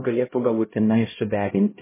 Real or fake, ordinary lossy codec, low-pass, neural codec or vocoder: fake; MP3, 16 kbps; 3.6 kHz; codec, 16 kHz, 0.5 kbps, X-Codec, HuBERT features, trained on LibriSpeech